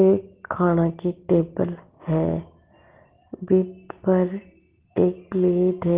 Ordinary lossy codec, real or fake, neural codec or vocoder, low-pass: Opus, 16 kbps; real; none; 3.6 kHz